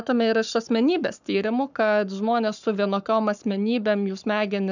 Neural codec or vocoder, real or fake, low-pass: codec, 44.1 kHz, 7.8 kbps, Pupu-Codec; fake; 7.2 kHz